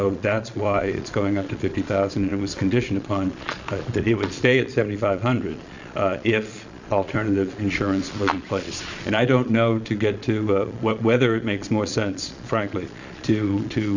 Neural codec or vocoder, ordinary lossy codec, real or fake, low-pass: vocoder, 22.05 kHz, 80 mel bands, Vocos; Opus, 64 kbps; fake; 7.2 kHz